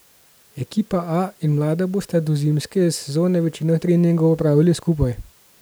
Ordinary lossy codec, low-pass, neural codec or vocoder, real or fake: none; none; none; real